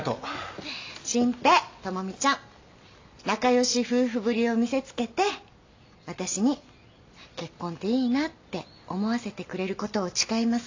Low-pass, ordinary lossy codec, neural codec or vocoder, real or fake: 7.2 kHz; AAC, 48 kbps; none; real